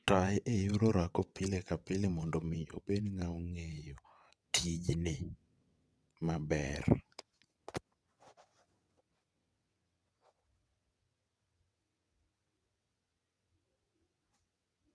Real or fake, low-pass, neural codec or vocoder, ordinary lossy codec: fake; none; vocoder, 22.05 kHz, 80 mel bands, WaveNeXt; none